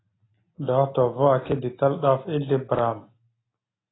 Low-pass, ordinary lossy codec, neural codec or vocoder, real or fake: 7.2 kHz; AAC, 16 kbps; none; real